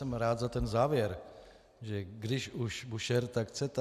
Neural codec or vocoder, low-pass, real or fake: none; 14.4 kHz; real